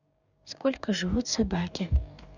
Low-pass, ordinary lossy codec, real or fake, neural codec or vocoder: 7.2 kHz; none; fake; codec, 44.1 kHz, 2.6 kbps, DAC